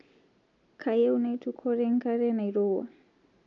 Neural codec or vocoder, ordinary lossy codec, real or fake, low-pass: none; MP3, 64 kbps; real; 7.2 kHz